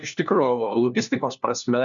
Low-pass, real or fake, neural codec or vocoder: 7.2 kHz; fake; codec, 16 kHz, 1 kbps, FunCodec, trained on LibriTTS, 50 frames a second